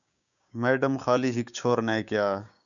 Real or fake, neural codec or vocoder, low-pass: fake; codec, 16 kHz, 6 kbps, DAC; 7.2 kHz